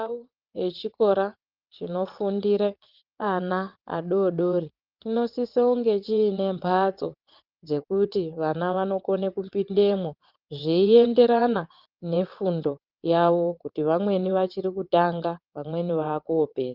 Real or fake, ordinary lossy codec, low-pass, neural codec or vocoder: fake; Opus, 32 kbps; 5.4 kHz; vocoder, 22.05 kHz, 80 mel bands, WaveNeXt